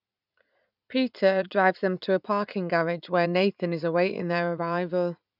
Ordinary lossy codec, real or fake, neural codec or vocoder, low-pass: none; fake; vocoder, 44.1 kHz, 80 mel bands, Vocos; 5.4 kHz